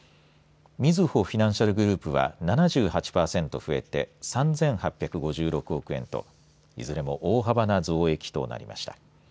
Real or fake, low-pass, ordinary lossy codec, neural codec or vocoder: real; none; none; none